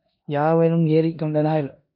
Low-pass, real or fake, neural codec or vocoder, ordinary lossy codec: 5.4 kHz; fake; codec, 16 kHz in and 24 kHz out, 0.9 kbps, LongCat-Audio-Codec, four codebook decoder; MP3, 32 kbps